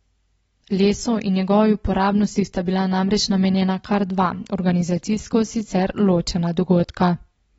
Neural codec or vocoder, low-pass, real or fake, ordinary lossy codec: none; 19.8 kHz; real; AAC, 24 kbps